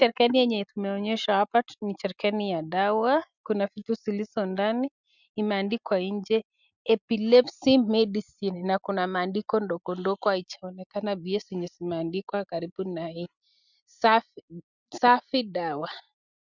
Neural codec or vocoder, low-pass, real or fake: none; 7.2 kHz; real